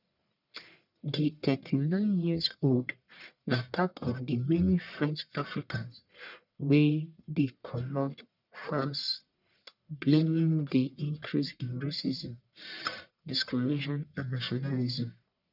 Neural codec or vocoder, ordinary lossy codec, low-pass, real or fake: codec, 44.1 kHz, 1.7 kbps, Pupu-Codec; none; 5.4 kHz; fake